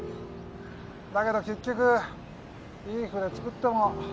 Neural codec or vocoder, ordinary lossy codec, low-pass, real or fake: none; none; none; real